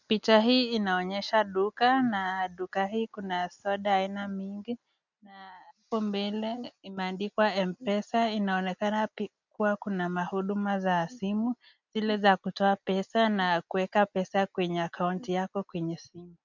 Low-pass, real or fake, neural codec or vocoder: 7.2 kHz; real; none